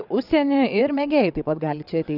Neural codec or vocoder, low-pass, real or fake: vocoder, 22.05 kHz, 80 mel bands, WaveNeXt; 5.4 kHz; fake